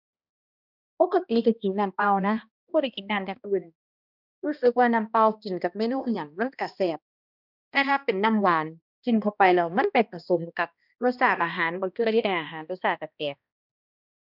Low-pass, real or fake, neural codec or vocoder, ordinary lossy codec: 5.4 kHz; fake; codec, 16 kHz, 1 kbps, X-Codec, HuBERT features, trained on balanced general audio; none